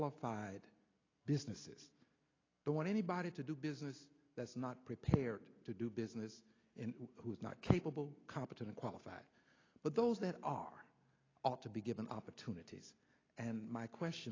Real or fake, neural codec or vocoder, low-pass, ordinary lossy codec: real; none; 7.2 kHz; AAC, 32 kbps